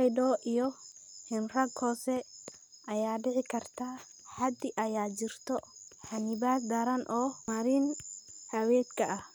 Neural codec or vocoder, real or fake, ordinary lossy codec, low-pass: none; real; none; none